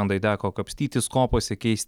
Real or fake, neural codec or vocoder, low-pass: real; none; 19.8 kHz